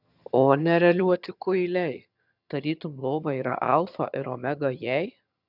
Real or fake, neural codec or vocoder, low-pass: fake; vocoder, 22.05 kHz, 80 mel bands, HiFi-GAN; 5.4 kHz